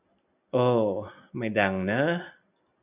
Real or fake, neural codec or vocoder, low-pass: real; none; 3.6 kHz